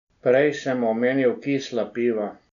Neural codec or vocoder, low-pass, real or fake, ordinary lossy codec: none; 7.2 kHz; real; none